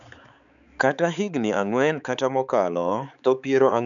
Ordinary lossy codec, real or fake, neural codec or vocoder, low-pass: none; fake; codec, 16 kHz, 4 kbps, X-Codec, HuBERT features, trained on balanced general audio; 7.2 kHz